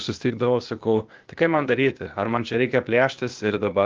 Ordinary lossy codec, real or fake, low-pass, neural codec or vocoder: Opus, 24 kbps; fake; 7.2 kHz; codec, 16 kHz, 0.8 kbps, ZipCodec